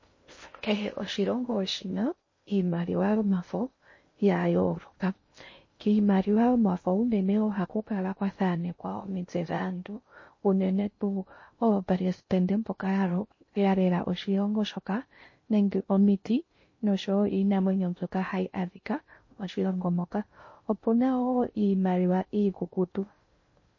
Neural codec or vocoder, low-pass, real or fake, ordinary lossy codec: codec, 16 kHz in and 24 kHz out, 0.6 kbps, FocalCodec, streaming, 2048 codes; 7.2 kHz; fake; MP3, 32 kbps